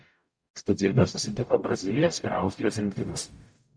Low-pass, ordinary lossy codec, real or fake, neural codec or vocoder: 9.9 kHz; Opus, 64 kbps; fake; codec, 44.1 kHz, 0.9 kbps, DAC